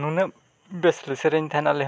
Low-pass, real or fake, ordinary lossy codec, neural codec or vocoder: none; real; none; none